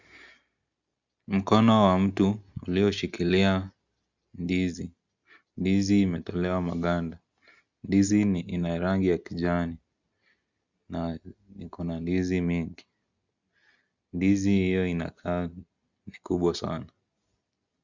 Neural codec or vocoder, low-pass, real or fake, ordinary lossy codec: none; 7.2 kHz; real; Opus, 64 kbps